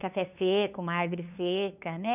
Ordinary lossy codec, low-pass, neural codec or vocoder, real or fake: none; 3.6 kHz; codec, 16 kHz, 2 kbps, FunCodec, trained on LibriTTS, 25 frames a second; fake